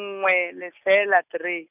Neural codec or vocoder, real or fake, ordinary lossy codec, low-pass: none; real; none; 3.6 kHz